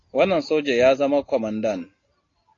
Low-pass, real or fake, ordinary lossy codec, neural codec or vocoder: 7.2 kHz; real; AAC, 32 kbps; none